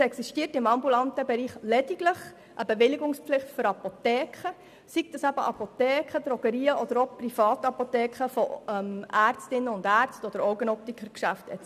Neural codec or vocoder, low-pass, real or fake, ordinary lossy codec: none; 14.4 kHz; real; none